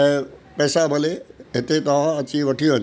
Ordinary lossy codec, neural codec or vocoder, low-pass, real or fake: none; none; none; real